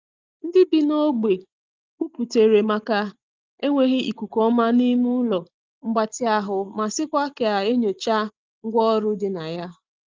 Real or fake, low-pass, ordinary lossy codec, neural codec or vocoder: real; 7.2 kHz; Opus, 24 kbps; none